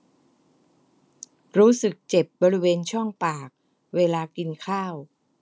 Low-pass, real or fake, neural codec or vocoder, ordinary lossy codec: none; real; none; none